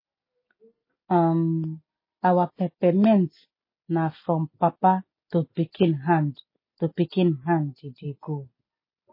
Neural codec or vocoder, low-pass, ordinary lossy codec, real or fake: none; 5.4 kHz; MP3, 24 kbps; real